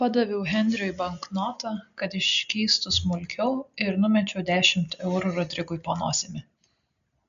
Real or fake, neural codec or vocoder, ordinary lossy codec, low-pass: real; none; AAC, 96 kbps; 7.2 kHz